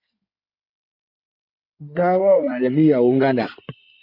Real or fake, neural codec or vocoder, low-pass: fake; codec, 16 kHz in and 24 kHz out, 2.2 kbps, FireRedTTS-2 codec; 5.4 kHz